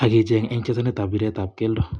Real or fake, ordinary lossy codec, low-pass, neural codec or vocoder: real; none; 9.9 kHz; none